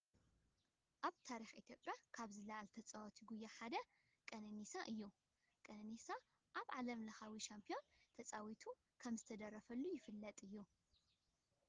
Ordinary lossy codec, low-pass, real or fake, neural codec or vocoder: Opus, 16 kbps; 7.2 kHz; real; none